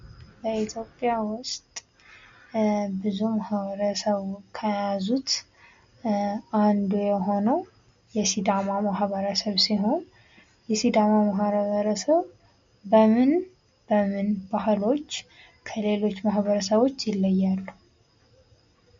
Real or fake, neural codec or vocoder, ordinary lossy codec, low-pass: real; none; MP3, 48 kbps; 7.2 kHz